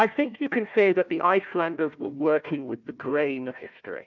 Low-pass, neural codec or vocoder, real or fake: 7.2 kHz; codec, 16 kHz, 1 kbps, FunCodec, trained on Chinese and English, 50 frames a second; fake